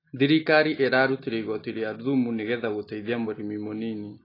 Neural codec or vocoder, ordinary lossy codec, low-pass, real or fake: none; AAC, 24 kbps; 5.4 kHz; real